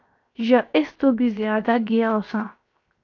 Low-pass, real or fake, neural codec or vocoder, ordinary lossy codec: 7.2 kHz; fake; codec, 16 kHz, 0.7 kbps, FocalCodec; AAC, 48 kbps